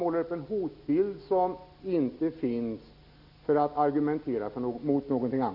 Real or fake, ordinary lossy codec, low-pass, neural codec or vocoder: real; none; 5.4 kHz; none